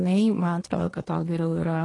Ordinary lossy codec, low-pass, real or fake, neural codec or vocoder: AAC, 32 kbps; 10.8 kHz; fake; codec, 24 kHz, 1 kbps, SNAC